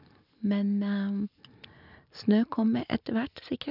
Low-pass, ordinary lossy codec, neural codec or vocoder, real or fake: 5.4 kHz; none; none; real